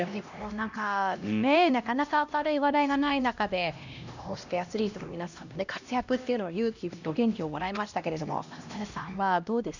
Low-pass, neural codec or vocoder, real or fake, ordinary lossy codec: 7.2 kHz; codec, 16 kHz, 1 kbps, X-Codec, HuBERT features, trained on LibriSpeech; fake; none